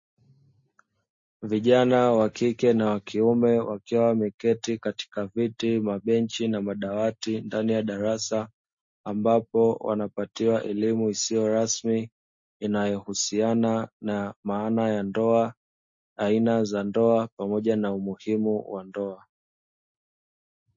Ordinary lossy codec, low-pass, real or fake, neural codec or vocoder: MP3, 32 kbps; 7.2 kHz; real; none